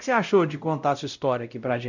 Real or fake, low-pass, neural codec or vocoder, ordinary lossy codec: fake; 7.2 kHz; codec, 16 kHz, 0.5 kbps, X-Codec, WavLM features, trained on Multilingual LibriSpeech; none